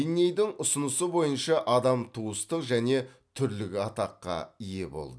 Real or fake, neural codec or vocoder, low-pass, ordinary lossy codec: real; none; none; none